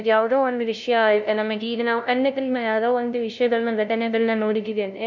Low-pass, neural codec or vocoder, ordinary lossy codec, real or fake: 7.2 kHz; codec, 16 kHz, 0.5 kbps, FunCodec, trained on LibriTTS, 25 frames a second; none; fake